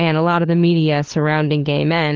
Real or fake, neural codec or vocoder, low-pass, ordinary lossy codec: fake; codec, 16 kHz, 4 kbps, FunCodec, trained on LibriTTS, 50 frames a second; 7.2 kHz; Opus, 16 kbps